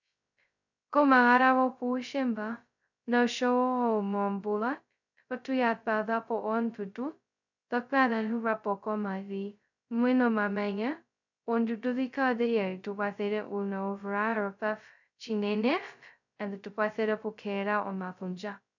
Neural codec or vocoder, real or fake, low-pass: codec, 16 kHz, 0.2 kbps, FocalCodec; fake; 7.2 kHz